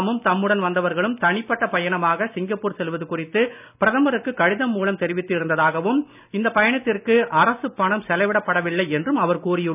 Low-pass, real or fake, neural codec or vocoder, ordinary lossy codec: 3.6 kHz; real; none; none